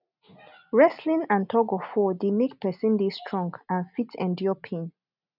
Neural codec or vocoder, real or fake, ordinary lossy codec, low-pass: none; real; none; 5.4 kHz